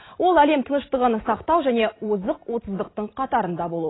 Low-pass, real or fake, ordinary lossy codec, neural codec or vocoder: 7.2 kHz; real; AAC, 16 kbps; none